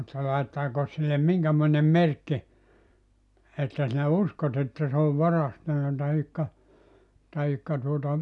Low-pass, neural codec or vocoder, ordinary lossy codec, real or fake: 10.8 kHz; none; none; real